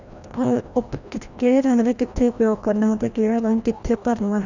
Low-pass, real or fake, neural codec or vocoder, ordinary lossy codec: 7.2 kHz; fake; codec, 16 kHz, 1 kbps, FreqCodec, larger model; none